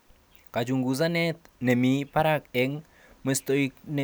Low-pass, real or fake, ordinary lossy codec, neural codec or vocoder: none; real; none; none